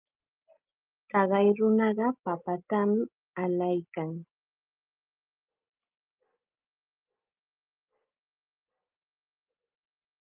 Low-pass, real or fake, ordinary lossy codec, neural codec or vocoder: 3.6 kHz; real; Opus, 24 kbps; none